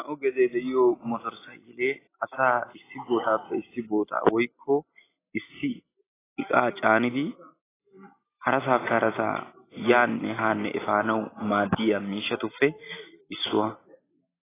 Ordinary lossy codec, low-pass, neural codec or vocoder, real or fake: AAC, 16 kbps; 3.6 kHz; none; real